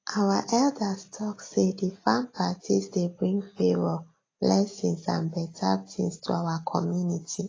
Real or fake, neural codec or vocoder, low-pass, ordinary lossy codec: real; none; 7.2 kHz; AAC, 32 kbps